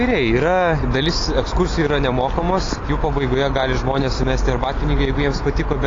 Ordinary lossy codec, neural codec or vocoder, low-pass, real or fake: AAC, 32 kbps; none; 7.2 kHz; real